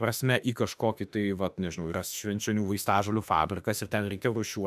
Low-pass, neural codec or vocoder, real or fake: 14.4 kHz; autoencoder, 48 kHz, 32 numbers a frame, DAC-VAE, trained on Japanese speech; fake